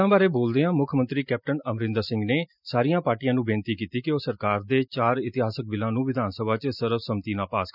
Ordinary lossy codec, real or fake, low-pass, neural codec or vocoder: none; real; 5.4 kHz; none